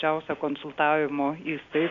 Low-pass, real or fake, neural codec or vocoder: 7.2 kHz; real; none